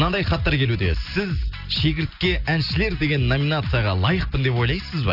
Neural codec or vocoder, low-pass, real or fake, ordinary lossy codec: none; 5.4 kHz; real; none